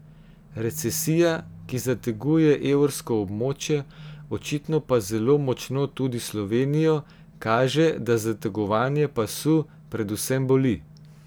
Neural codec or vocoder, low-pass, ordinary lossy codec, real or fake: none; none; none; real